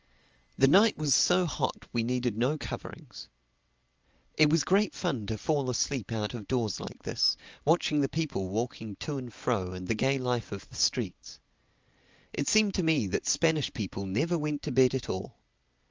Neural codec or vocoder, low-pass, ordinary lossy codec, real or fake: none; 7.2 kHz; Opus, 24 kbps; real